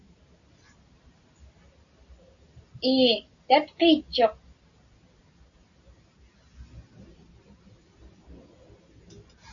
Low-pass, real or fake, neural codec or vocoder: 7.2 kHz; real; none